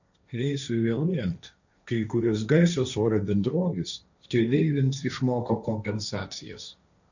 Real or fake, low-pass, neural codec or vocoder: fake; 7.2 kHz; codec, 16 kHz, 1.1 kbps, Voila-Tokenizer